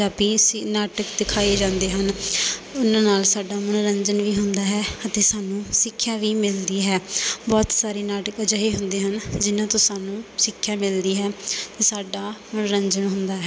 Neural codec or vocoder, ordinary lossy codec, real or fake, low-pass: none; none; real; none